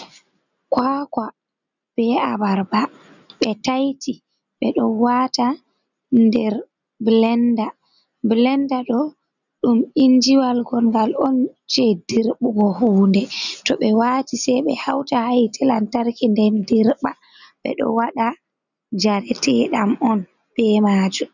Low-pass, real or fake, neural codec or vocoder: 7.2 kHz; real; none